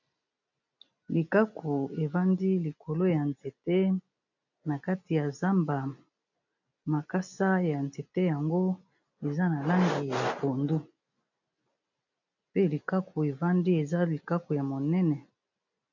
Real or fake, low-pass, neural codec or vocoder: real; 7.2 kHz; none